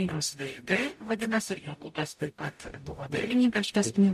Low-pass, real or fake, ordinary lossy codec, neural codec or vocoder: 14.4 kHz; fake; MP3, 64 kbps; codec, 44.1 kHz, 0.9 kbps, DAC